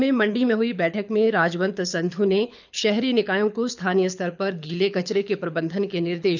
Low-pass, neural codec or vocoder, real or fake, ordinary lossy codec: 7.2 kHz; codec, 24 kHz, 6 kbps, HILCodec; fake; none